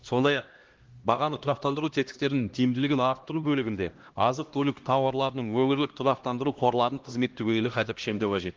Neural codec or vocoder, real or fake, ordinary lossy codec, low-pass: codec, 16 kHz, 1 kbps, X-Codec, HuBERT features, trained on LibriSpeech; fake; Opus, 16 kbps; 7.2 kHz